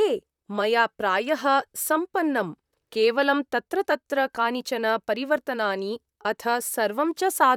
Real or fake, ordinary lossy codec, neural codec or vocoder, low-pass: fake; none; vocoder, 44.1 kHz, 128 mel bands, Pupu-Vocoder; 19.8 kHz